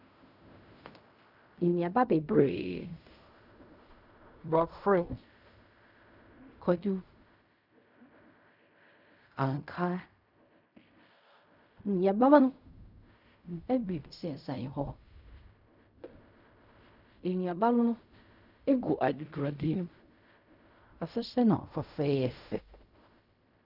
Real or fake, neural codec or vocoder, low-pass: fake; codec, 16 kHz in and 24 kHz out, 0.4 kbps, LongCat-Audio-Codec, fine tuned four codebook decoder; 5.4 kHz